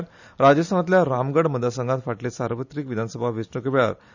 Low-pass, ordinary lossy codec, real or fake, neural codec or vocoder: 7.2 kHz; none; real; none